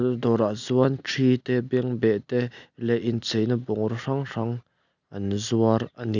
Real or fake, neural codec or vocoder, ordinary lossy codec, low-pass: real; none; none; 7.2 kHz